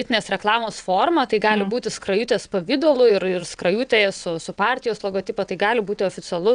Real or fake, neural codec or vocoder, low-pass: fake; vocoder, 22.05 kHz, 80 mel bands, WaveNeXt; 9.9 kHz